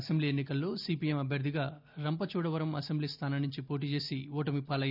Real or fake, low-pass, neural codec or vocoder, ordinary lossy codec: real; 5.4 kHz; none; none